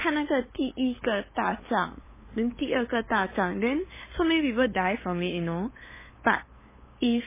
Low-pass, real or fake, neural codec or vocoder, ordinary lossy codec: 3.6 kHz; fake; codec, 16 kHz, 8 kbps, FunCodec, trained on LibriTTS, 25 frames a second; MP3, 16 kbps